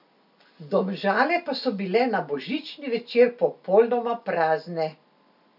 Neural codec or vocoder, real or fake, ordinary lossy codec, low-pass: none; real; none; 5.4 kHz